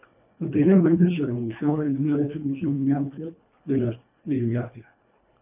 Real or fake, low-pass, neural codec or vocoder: fake; 3.6 kHz; codec, 24 kHz, 1.5 kbps, HILCodec